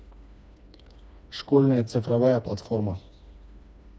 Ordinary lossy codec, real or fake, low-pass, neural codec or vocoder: none; fake; none; codec, 16 kHz, 2 kbps, FreqCodec, smaller model